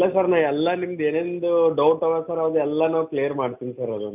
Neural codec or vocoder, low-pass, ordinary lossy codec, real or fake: none; 3.6 kHz; none; real